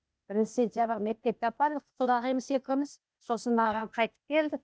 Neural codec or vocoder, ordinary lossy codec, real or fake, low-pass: codec, 16 kHz, 0.8 kbps, ZipCodec; none; fake; none